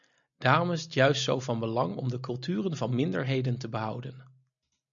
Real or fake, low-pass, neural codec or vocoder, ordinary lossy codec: real; 7.2 kHz; none; MP3, 96 kbps